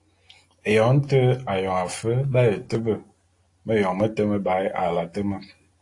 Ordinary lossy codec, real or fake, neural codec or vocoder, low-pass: AAC, 48 kbps; real; none; 10.8 kHz